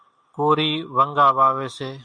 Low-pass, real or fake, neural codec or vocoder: 9.9 kHz; real; none